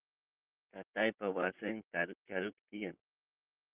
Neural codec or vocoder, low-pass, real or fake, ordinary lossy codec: vocoder, 44.1 kHz, 128 mel bands, Pupu-Vocoder; 3.6 kHz; fake; Opus, 24 kbps